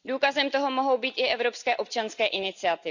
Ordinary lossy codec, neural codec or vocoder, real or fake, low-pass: none; none; real; 7.2 kHz